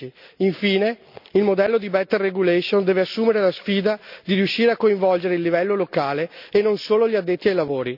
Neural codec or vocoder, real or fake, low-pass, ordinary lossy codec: none; real; 5.4 kHz; none